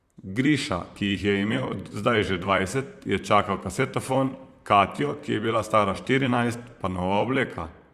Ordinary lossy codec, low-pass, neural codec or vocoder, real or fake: Opus, 64 kbps; 14.4 kHz; vocoder, 44.1 kHz, 128 mel bands, Pupu-Vocoder; fake